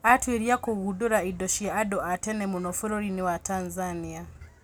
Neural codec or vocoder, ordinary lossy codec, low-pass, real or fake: none; none; none; real